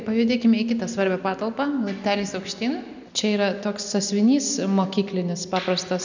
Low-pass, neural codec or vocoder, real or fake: 7.2 kHz; none; real